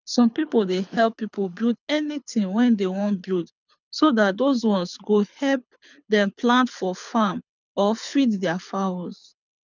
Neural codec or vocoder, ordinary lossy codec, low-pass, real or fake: codec, 24 kHz, 6 kbps, HILCodec; none; 7.2 kHz; fake